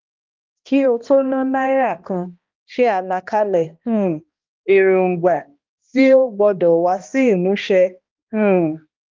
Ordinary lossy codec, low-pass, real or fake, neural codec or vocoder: Opus, 24 kbps; 7.2 kHz; fake; codec, 16 kHz, 1 kbps, X-Codec, HuBERT features, trained on balanced general audio